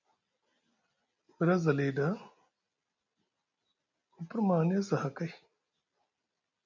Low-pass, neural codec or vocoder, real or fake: 7.2 kHz; none; real